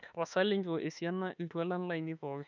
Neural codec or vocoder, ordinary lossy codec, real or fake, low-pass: autoencoder, 48 kHz, 32 numbers a frame, DAC-VAE, trained on Japanese speech; none; fake; 7.2 kHz